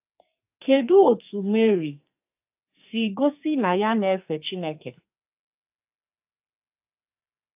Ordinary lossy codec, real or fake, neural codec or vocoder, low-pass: AAC, 32 kbps; fake; codec, 44.1 kHz, 2.6 kbps, SNAC; 3.6 kHz